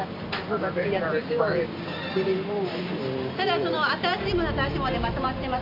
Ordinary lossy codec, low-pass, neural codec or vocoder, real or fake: MP3, 48 kbps; 5.4 kHz; none; real